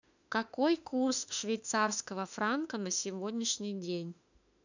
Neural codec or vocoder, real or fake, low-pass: autoencoder, 48 kHz, 32 numbers a frame, DAC-VAE, trained on Japanese speech; fake; 7.2 kHz